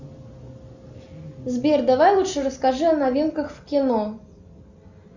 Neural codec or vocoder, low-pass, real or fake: none; 7.2 kHz; real